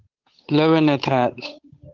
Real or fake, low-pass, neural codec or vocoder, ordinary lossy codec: fake; 7.2 kHz; codec, 16 kHz, 8 kbps, FunCodec, trained on Chinese and English, 25 frames a second; Opus, 32 kbps